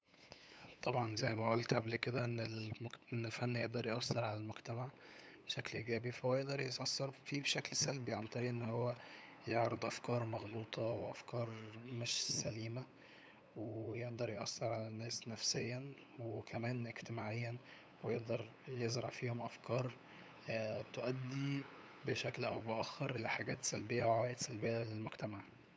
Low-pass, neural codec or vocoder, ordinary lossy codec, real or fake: none; codec, 16 kHz, 8 kbps, FunCodec, trained on LibriTTS, 25 frames a second; none; fake